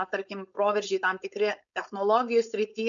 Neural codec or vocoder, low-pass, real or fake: codec, 16 kHz, 4.8 kbps, FACodec; 7.2 kHz; fake